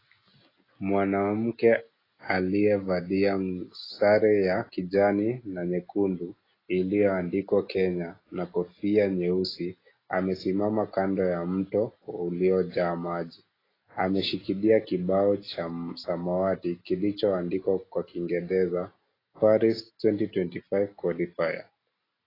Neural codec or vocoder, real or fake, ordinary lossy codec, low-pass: none; real; AAC, 24 kbps; 5.4 kHz